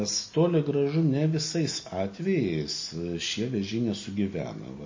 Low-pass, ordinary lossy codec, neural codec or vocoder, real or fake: 7.2 kHz; MP3, 32 kbps; none; real